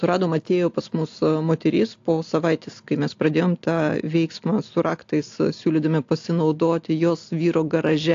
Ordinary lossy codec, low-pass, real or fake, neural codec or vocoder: AAC, 48 kbps; 7.2 kHz; real; none